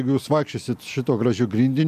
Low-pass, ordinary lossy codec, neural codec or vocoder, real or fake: 14.4 kHz; AAC, 96 kbps; none; real